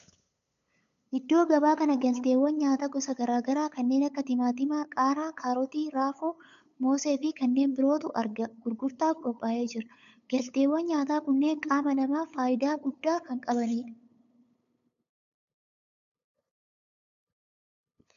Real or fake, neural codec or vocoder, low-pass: fake; codec, 16 kHz, 8 kbps, FunCodec, trained on LibriTTS, 25 frames a second; 7.2 kHz